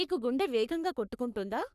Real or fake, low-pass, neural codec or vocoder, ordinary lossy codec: fake; 14.4 kHz; codec, 44.1 kHz, 3.4 kbps, Pupu-Codec; none